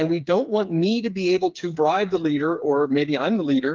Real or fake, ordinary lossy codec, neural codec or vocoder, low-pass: fake; Opus, 32 kbps; codec, 16 kHz, 4 kbps, FreqCodec, smaller model; 7.2 kHz